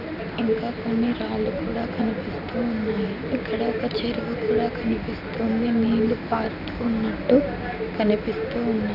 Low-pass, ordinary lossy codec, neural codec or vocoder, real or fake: 5.4 kHz; none; none; real